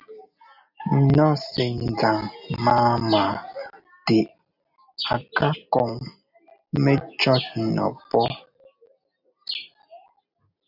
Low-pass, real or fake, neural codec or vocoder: 5.4 kHz; real; none